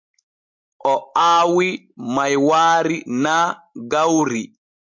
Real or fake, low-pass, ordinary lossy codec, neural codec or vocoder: real; 7.2 kHz; MP3, 64 kbps; none